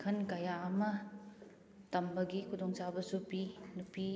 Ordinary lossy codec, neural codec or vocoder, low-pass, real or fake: none; none; none; real